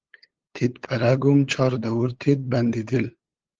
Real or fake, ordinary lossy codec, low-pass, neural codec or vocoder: fake; Opus, 16 kbps; 7.2 kHz; codec, 16 kHz, 8 kbps, FunCodec, trained on LibriTTS, 25 frames a second